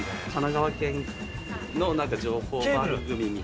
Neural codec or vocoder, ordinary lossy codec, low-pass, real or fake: none; none; none; real